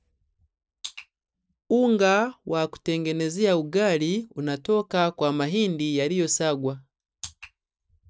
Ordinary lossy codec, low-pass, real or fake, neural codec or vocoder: none; none; real; none